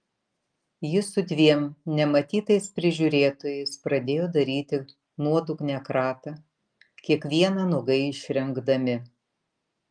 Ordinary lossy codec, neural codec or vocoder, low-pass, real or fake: Opus, 32 kbps; none; 9.9 kHz; real